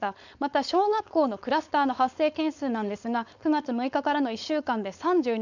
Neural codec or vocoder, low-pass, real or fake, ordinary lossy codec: codec, 16 kHz, 4.8 kbps, FACodec; 7.2 kHz; fake; none